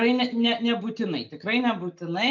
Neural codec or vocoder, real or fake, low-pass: none; real; 7.2 kHz